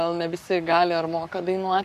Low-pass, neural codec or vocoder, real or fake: 14.4 kHz; codec, 44.1 kHz, 7.8 kbps, Pupu-Codec; fake